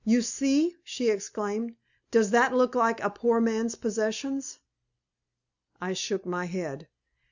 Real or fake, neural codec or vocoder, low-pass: real; none; 7.2 kHz